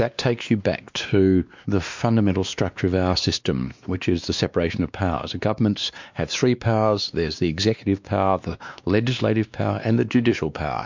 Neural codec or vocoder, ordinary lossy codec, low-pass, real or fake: codec, 16 kHz, 2 kbps, X-Codec, WavLM features, trained on Multilingual LibriSpeech; MP3, 64 kbps; 7.2 kHz; fake